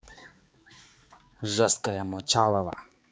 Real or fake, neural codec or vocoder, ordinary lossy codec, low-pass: fake; codec, 16 kHz, 4 kbps, X-Codec, HuBERT features, trained on balanced general audio; none; none